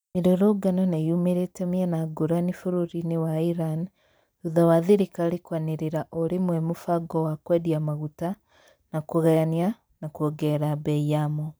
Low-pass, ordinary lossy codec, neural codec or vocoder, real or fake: none; none; vocoder, 44.1 kHz, 128 mel bands every 512 samples, BigVGAN v2; fake